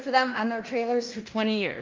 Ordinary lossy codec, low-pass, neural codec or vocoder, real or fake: Opus, 32 kbps; 7.2 kHz; codec, 16 kHz in and 24 kHz out, 0.9 kbps, LongCat-Audio-Codec, fine tuned four codebook decoder; fake